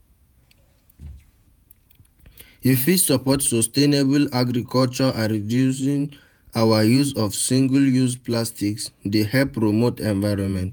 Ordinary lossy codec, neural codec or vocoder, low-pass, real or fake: none; vocoder, 48 kHz, 128 mel bands, Vocos; none; fake